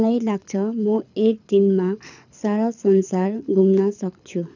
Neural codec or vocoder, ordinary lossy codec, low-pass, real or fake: codec, 24 kHz, 6 kbps, HILCodec; none; 7.2 kHz; fake